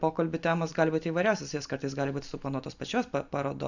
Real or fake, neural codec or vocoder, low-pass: real; none; 7.2 kHz